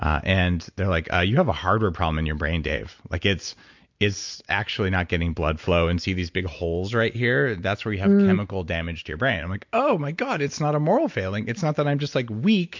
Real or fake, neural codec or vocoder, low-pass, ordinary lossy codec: real; none; 7.2 kHz; MP3, 64 kbps